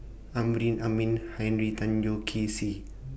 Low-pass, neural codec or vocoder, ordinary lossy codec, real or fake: none; none; none; real